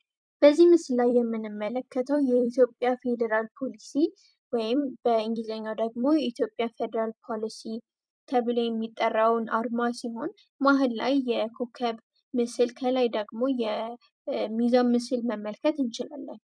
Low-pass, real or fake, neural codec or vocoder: 9.9 kHz; fake; vocoder, 44.1 kHz, 128 mel bands every 256 samples, BigVGAN v2